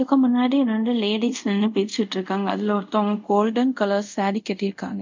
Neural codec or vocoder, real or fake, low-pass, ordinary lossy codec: codec, 24 kHz, 0.5 kbps, DualCodec; fake; 7.2 kHz; MP3, 64 kbps